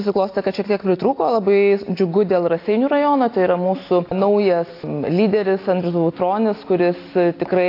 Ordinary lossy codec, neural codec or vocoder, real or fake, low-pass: AAC, 32 kbps; none; real; 5.4 kHz